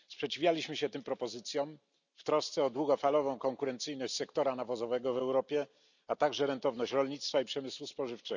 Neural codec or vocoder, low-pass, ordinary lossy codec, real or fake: none; 7.2 kHz; none; real